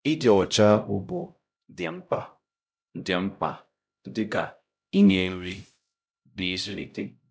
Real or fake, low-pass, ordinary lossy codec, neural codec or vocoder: fake; none; none; codec, 16 kHz, 0.5 kbps, X-Codec, HuBERT features, trained on LibriSpeech